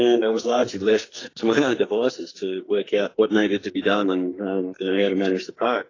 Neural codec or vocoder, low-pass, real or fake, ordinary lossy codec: codec, 44.1 kHz, 2.6 kbps, SNAC; 7.2 kHz; fake; AAC, 32 kbps